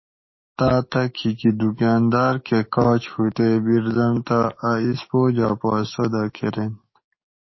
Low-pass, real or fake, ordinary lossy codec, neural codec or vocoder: 7.2 kHz; fake; MP3, 24 kbps; codec, 44.1 kHz, 7.8 kbps, DAC